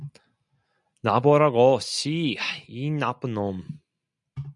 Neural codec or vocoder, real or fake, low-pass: none; real; 10.8 kHz